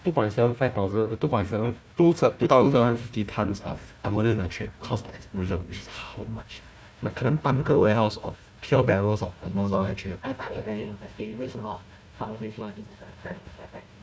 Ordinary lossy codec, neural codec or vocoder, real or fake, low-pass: none; codec, 16 kHz, 1 kbps, FunCodec, trained on Chinese and English, 50 frames a second; fake; none